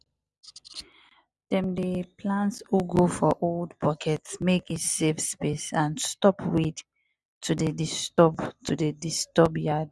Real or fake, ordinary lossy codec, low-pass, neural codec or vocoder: real; none; none; none